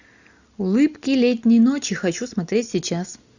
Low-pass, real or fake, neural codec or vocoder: 7.2 kHz; real; none